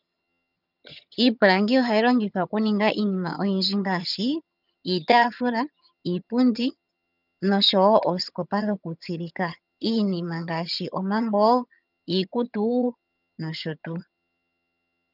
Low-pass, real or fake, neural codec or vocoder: 5.4 kHz; fake; vocoder, 22.05 kHz, 80 mel bands, HiFi-GAN